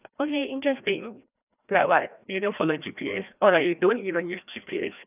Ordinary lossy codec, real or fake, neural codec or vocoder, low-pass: none; fake; codec, 16 kHz, 1 kbps, FreqCodec, larger model; 3.6 kHz